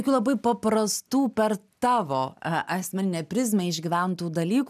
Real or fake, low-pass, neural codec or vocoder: real; 14.4 kHz; none